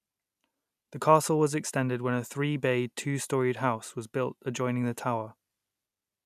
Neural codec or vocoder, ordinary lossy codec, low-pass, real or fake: none; none; 14.4 kHz; real